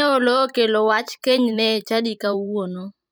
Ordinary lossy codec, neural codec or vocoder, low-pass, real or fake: none; vocoder, 44.1 kHz, 128 mel bands every 512 samples, BigVGAN v2; none; fake